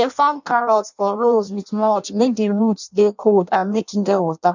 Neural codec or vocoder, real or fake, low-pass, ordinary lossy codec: codec, 16 kHz in and 24 kHz out, 0.6 kbps, FireRedTTS-2 codec; fake; 7.2 kHz; none